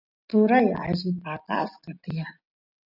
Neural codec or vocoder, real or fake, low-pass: none; real; 5.4 kHz